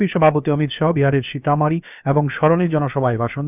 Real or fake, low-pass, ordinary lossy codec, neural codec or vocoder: fake; 3.6 kHz; none; codec, 16 kHz, about 1 kbps, DyCAST, with the encoder's durations